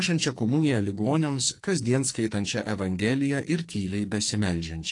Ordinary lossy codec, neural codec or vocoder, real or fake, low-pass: AAC, 48 kbps; codec, 44.1 kHz, 2.6 kbps, SNAC; fake; 10.8 kHz